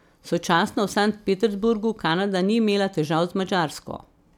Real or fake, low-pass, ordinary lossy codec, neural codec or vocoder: real; 19.8 kHz; none; none